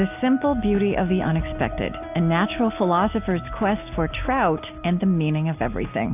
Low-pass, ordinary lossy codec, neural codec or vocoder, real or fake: 3.6 kHz; AAC, 32 kbps; none; real